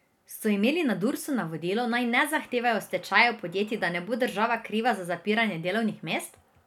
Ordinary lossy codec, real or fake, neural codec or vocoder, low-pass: none; real; none; 19.8 kHz